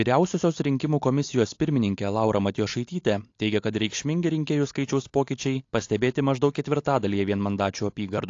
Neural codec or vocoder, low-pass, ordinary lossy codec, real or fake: none; 7.2 kHz; AAC, 48 kbps; real